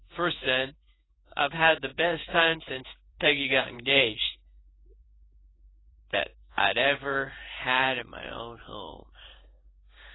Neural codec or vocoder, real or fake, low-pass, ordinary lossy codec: codec, 16 kHz in and 24 kHz out, 1 kbps, XY-Tokenizer; fake; 7.2 kHz; AAC, 16 kbps